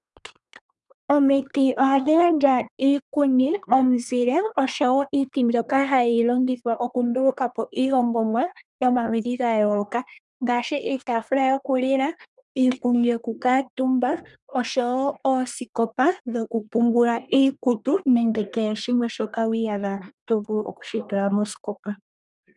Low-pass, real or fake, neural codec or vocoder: 10.8 kHz; fake; codec, 24 kHz, 1 kbps, SNAC